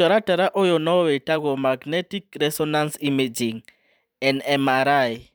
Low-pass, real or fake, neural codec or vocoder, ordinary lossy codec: none; fake; vocoder, 44.1 kHz, 128 mel bands every 512 samples, BigVGAN v2; none